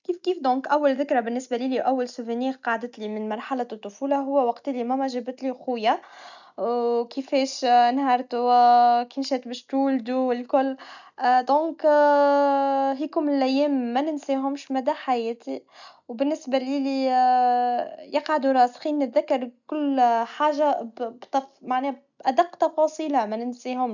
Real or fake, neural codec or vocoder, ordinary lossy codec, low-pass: real; none; none; 7.2 kHz